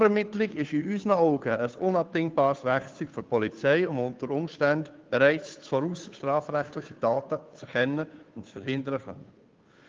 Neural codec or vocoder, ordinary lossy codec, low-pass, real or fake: codec, 16 kHz, 2 kbps, FunCodec, trained on Chinese and English, 25 frames a second; Opus, 32 kbps; 7.2 kHz; fake